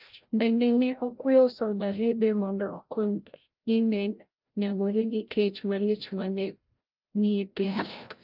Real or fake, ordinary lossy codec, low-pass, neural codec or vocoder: fake; Opus, 24 kbps; 5.4 kHz; codec, 16 kHz, 0.5 kbps, FreqCodec, larger model